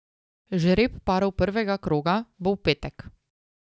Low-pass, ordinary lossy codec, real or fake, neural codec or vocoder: none; none; real; none